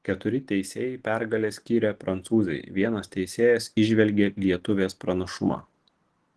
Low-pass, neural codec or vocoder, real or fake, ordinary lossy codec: 10.8 kHz; none; real; Opus, 16 kbps